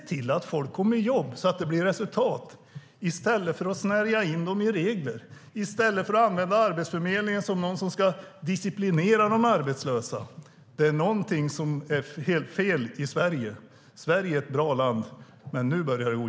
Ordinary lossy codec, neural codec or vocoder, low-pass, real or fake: none; none; none; real